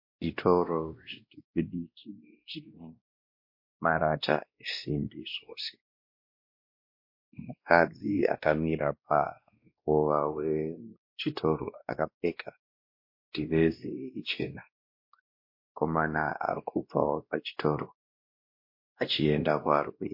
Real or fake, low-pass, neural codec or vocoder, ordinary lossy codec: fake; 5.4 kHz; codec, 16 kHz, 1 kbps, X-Codec, WavLM features, trained on Multilingual LibriSpeech; MP3, 32 kbps